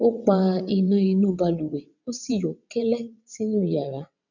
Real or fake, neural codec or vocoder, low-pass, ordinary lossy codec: fake; vocoder, 22.05 kHz, 80 mel bands, WaveNeXt; 7.2 kHz; none